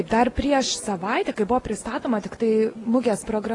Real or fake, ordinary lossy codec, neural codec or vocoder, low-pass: real; AAC, 32 kbps; none; 10.8 kHz